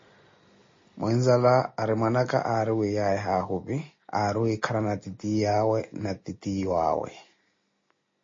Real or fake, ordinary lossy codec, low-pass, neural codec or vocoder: real; MP3, 32 kbps; 7.2 kHz; none